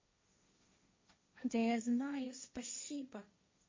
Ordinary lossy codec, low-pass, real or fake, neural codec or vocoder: MP3, 32 kbps; 7.2 kHz; fake; codec, 16 kHz, 1.1 kbps, Voila-Tokenizer